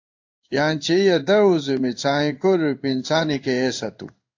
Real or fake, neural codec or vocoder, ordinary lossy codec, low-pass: fake; codec, 16 kHz in and 24 kHz out, 1 kbps, XY-Tokenizer; AAC, 48 kbps; 7.2 kHz